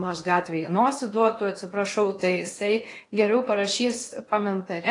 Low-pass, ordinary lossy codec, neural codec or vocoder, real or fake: 10.8 kHz; AAC, 48 kbps; codec, 16 kHz in and 24 kHz out, 0.8 kbps, FocalCodec, streaming, 65536 codes; fake